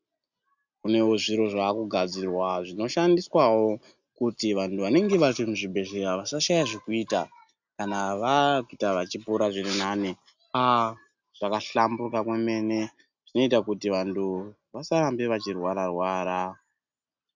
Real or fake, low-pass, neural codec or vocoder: real; 7.2 kHz; none